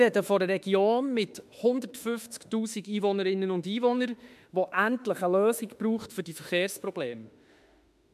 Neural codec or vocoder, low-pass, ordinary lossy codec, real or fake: autoencoder, 48 kHz, 32 numbers a frame, DAC-VAE, trained on Japanese speech; 14.4 kHz; MP3, 96 kbps; fake